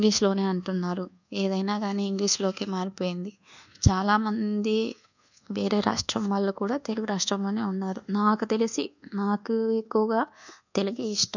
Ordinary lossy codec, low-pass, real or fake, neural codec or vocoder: none; 7.2 kHz; fake; codec, 24 kHz, 1.2 kbps, DualCodec